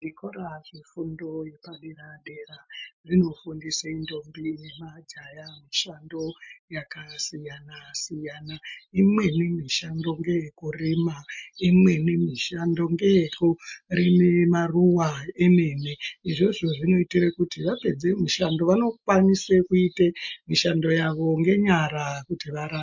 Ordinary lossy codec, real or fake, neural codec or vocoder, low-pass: MP3, 48 kbps; real; none; 7.2 kHz